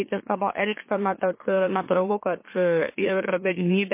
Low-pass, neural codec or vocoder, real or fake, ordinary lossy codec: 3.6 kHz; autoencoder, 44.1 kHz, a latent of 192 numbers a frame, MeloTTS; fake; MP3, 24 kbps